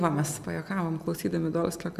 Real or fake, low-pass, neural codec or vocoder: real; 14.4 kHz; none